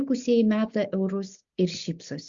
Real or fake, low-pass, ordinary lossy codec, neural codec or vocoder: real; 7.2 kHz; Opus, 64 kbps; none